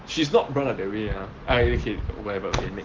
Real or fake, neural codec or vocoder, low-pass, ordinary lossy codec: real; none; 7.2 kHz; Opus, 16 kbps